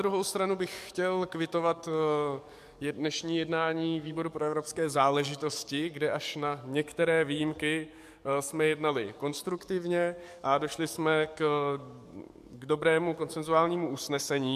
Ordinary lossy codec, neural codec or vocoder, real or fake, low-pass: MP3, 96 kbps; codec, 44.1 kHz, 7.8 kbps, DAC; fake; 14.4 kHz